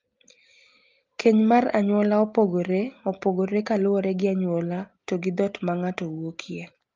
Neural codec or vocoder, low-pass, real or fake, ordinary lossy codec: none; 7.2 kHz; real; Opus, 32 kbps